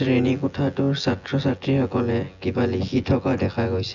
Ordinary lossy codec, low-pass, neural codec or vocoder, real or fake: none; 7.2 kHz; vocoder, 24 kHz, 100 mel bands, Vocos; fake